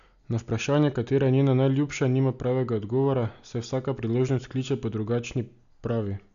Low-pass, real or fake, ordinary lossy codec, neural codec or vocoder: 7.2 kHz; real; none; none